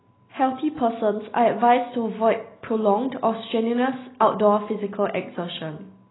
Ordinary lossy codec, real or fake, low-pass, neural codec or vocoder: AAC, 16 kbps; real; 7.2 kHz; none